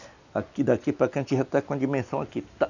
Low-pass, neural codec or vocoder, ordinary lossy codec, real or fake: 7.2 kHz; none; none; real